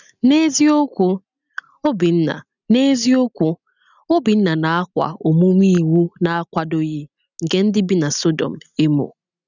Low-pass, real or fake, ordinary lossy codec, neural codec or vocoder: 7.2 kHz; real; none; none